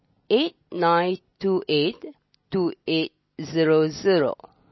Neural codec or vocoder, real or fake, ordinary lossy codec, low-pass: none; real; MP3, 24 kbps; 7.2 kHz